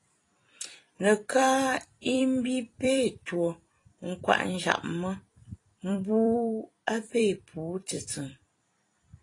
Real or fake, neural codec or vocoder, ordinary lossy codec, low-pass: fake; vocoder, 44.1 kHz, 128 mel bands every 256 samples, BigVGAN v2; AAC, 32 kbps; 10.8 kHz